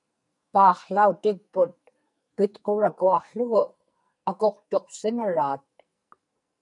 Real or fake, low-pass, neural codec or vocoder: fake; 10.8 kHz; codec, 44.1 kHz, 2.6 kbps, SNAC